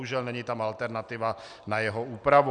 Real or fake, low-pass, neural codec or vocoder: real; 9.9 kHz; none